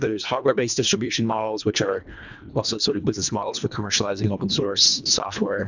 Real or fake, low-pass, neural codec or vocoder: fake; 7.2 kHz; codec, 24 kHz, 1.5 kbps, HILCodec